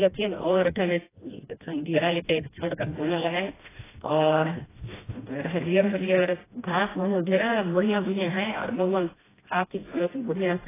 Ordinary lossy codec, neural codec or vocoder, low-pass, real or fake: AAC, 16 kbps; codec, 16 kHz, 1 kbps, FreqCodec, smaller model; 3.6 kHz; fake